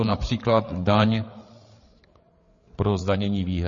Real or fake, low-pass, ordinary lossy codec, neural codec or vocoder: fake; 7.2 kHz; MP3, 32 kbps; codec, 16 kHz, 8 kbps, FreqCodec, larger model